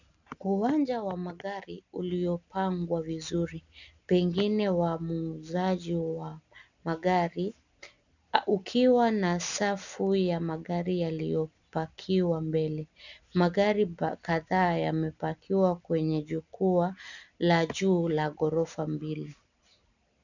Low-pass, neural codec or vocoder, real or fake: 7.2 kHz; none; real